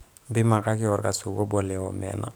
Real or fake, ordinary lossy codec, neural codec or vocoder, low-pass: fake; none; codec, 44.1 kHz, 7.8 kbps, DAC; none